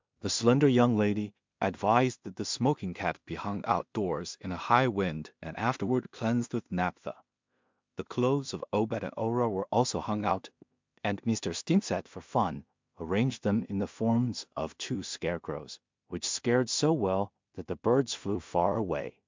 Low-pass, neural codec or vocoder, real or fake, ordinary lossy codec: 7.2 kHz; codec, 16 kHz in and 24 kHz out, 0.4 kbps, LongCat-Audio-Codec, two codebook decoder; fake; MP3, 64 kbps